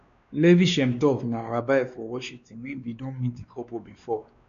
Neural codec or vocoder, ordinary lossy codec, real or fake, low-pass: codec, 16 kHz, 2 kbps, X-Codec, WavLM features, trained on Multilingual LibriSpeech; none; fake; 7.2 kHz